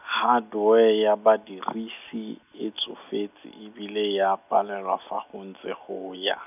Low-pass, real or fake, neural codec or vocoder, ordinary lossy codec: 3.6 kHz; real; none; none